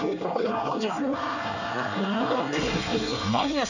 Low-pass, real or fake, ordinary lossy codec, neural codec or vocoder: 7.2 kHz; fake; none; codec, 24 kHz, 1 kbps, SNAC